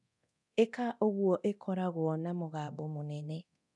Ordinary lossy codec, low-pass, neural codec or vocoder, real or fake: none; 10.8 kHz; codec, 24 kHz, 0.9 kbps, DualCodec; fake